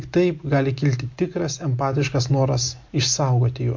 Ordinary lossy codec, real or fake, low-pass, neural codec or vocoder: MP3, 48 kbps; real; 7.2 kHz; none